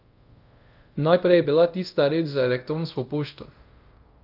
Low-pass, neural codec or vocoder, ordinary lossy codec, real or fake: 5.4 kHz; codec, 24 kHz, 0.5 kbps, DualCodec; Opus, 32 kbps; fake